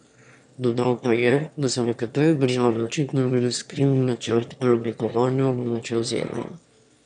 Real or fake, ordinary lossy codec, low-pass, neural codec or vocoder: fake; none; 9.9 kHz; autoencoder, 22.05 kHz, a latent of 192 numbers a frame, VITS, trained on one speaker